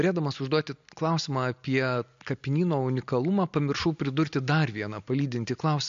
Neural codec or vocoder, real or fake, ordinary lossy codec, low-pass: none; real; MP3, 48 kbps; 7.2 kHz